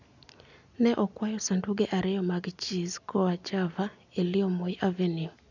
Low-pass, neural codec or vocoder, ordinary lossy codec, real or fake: 7.2 kHz; none; none; real